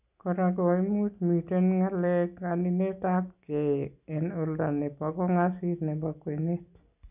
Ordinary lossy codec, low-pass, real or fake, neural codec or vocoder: none; 3.6 kHz; real; none